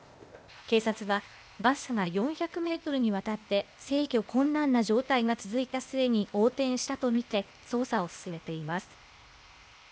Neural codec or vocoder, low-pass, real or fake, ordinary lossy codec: codec, 16 kHz, 0.8 kbps, ZipCodec; none; fake; none